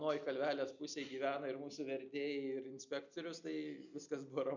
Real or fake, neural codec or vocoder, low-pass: real; none; 7.2 kHz